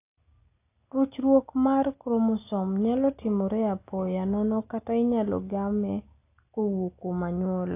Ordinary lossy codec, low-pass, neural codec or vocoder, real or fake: AAC, 24 kbps; 3.6 kHz; none; real